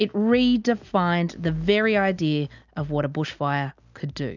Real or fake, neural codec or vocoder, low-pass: real; none; 7.2 kHz